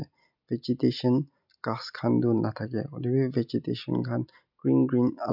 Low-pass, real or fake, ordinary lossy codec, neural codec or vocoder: 5.4 kHz; real; none; none